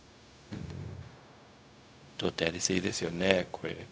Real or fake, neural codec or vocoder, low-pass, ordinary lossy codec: fake; codec, 16 kHz, 0.4 kbps, LongCat-Audio-Codec; none; none